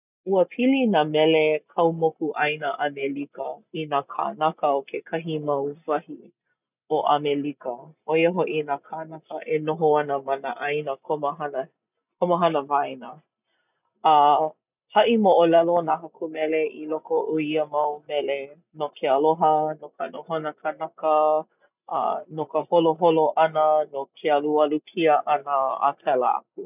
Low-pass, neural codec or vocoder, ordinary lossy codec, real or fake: 3.6 kHz; none; none; real